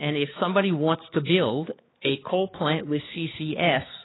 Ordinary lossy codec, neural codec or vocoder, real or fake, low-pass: AAC, 16 kbps; codec, 16 kHz, 4 kbps, X-Codec, HuBERT features, trained on balanced general audio; fake; 7.2 kHz